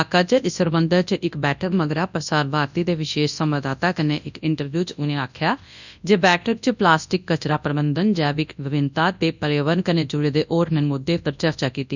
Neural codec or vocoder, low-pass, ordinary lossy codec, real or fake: codec, 24 kHz, 0.9 kbps, WavTokenizer, large speech release; 7.2 kHz; none; fake